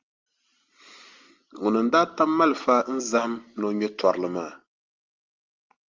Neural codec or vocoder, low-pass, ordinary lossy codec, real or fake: none; 7.2 kHz; Opus, 32 kbps; real